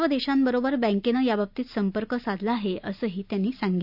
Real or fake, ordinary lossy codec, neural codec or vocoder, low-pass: real; none; none; 5.4 kHz